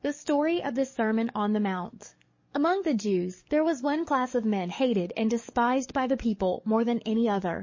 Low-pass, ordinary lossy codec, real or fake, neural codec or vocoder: 7.2 kHz; MP3, 32 kbps; fake; codec, 44.1 kHz, 7.8 kbps, DAC